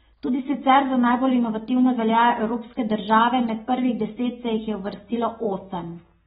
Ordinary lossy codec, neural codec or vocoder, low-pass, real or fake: AAC, 16 kbps; none; 19.8 kHz; real